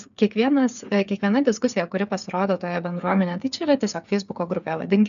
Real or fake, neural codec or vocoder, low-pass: fake; codec, 16 kHz, 8 kbps, FreqCodec, smaller model; 7.2 kHz